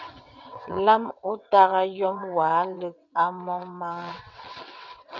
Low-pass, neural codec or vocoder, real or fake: 7.2 kHz; vocoder, 22.05 kHz, 80 mel bands, WaveNeXt; fake